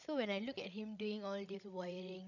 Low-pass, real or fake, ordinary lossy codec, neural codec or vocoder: 7.2 kHz; fake; none; codec, 16 kHz, 16 kbps, FreqCodec, larger model